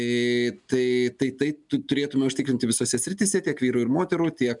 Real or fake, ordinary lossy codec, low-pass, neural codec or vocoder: real; MP3, 96 kbps; 10.8 kHz; none